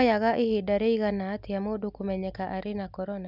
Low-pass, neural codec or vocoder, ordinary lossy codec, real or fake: 5.4 kHz; none; none; real